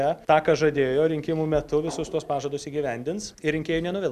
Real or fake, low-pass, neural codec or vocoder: real; 14.4 kHz; none